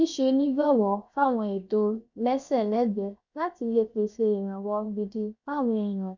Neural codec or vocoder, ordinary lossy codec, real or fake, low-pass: codec, 16 kHz, 0.7 kbps, FocalCodec; none; fake; 7.2 kHz